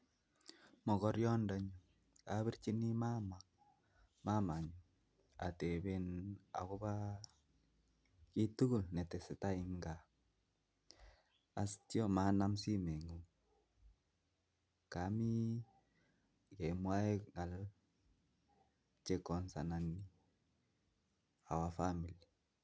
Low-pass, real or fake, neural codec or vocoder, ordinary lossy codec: none; real; none; none